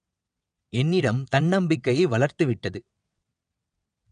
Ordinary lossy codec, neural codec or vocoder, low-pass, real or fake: none; vocoder, 22.05 kHz, 80 mel bands, WaveNeXt; 9.9 kHz; fake